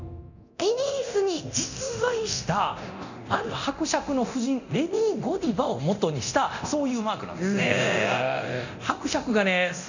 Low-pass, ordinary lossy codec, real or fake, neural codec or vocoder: 7.2 kHz; none; fake; codec, 24 kHz, 0.9 kbps, DualCodec